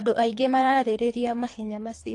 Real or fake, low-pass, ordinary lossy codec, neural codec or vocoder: fake; 10.8 kHz; AAC, 48 kbps; codec, 24 kHz, 3 kbps, HILCodec